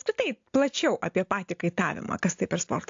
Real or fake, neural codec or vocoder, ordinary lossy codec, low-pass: real; none; AAC, 48 kbps; 7.2 kHz